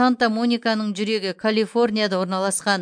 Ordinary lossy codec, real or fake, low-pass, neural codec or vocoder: MP3, 64 kbps; real; 9.9 kHz; none